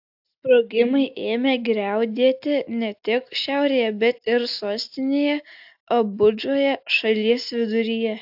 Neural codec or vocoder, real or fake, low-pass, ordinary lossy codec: none; real; 5.4 kHz; AAC, 48 kbps